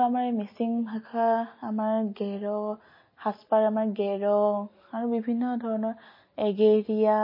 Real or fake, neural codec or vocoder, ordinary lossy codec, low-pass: real; none; MP3, 24 kbps; 5.4 kHz